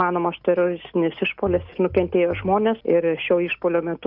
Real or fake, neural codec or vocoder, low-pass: real; none; 5.4 kHz